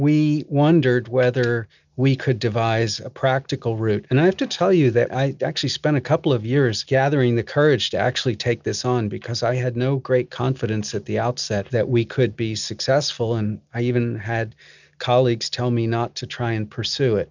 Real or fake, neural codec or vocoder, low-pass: real; none; 7.2 kHz